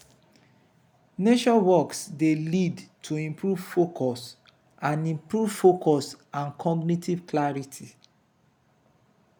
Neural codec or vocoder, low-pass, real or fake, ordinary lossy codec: none; none; real; none